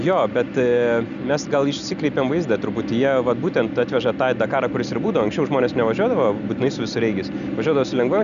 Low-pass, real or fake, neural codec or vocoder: 7.2 kHz; real; none